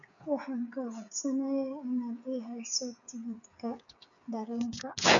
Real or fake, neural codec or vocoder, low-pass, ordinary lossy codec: fake; codec, 16 kHz, 8 kbps, FreqCodec, smaller model; 7.2 kHz; none